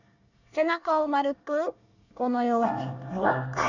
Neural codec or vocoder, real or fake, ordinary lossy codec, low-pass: codec, 24 kHz, 1 kbps, SNAC; fake; none; 7.2 kHz